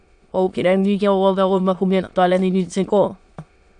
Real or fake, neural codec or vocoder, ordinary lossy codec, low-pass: fake; autoencoder, 22.05 kHz, a latent of 192 numbers a frame, VITS, trained on many speakers; MP3, 96 kbps; 9.9 kHz